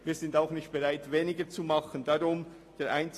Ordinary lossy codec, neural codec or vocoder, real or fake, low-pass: AAC, 48 kbps; none; real; 14.4 kHz